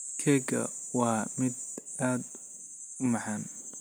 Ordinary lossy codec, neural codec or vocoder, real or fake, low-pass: none; none; real; none